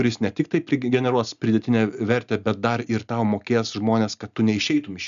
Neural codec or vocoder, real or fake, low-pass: none; real; 7.2 kHz